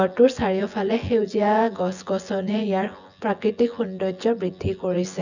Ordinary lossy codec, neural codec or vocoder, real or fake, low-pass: none; vocoder, 24 kHz, 100 mel bands, Vocos; fake; 7.2 kHz